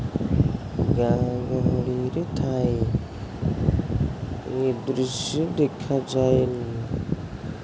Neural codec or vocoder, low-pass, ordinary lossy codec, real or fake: none; none; none; real